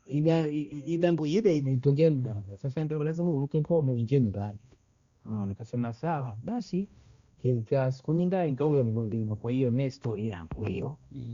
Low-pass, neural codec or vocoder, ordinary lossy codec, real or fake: 7.2 kHz; codec, 16 kHz, 1 kbps, X-Codec, HuBERT features, trained on balanced general audio; Opus, 64 kbps; fake